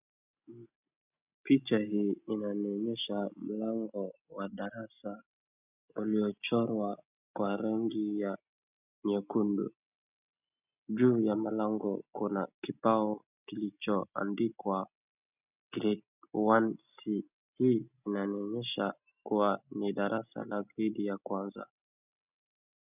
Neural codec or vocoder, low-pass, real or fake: none; 3.6 kHz; real